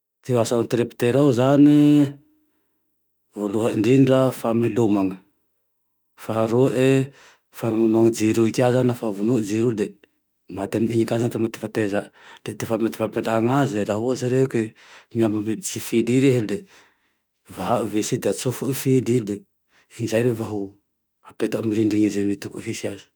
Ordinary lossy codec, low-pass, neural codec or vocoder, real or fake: none; none; autoencoder, 48 kHz, 32 numbers a frame, DAC-VAE, trained on Japanese speech; fake